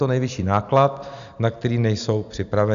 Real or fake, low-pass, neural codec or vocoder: real; 7.2 kHz; none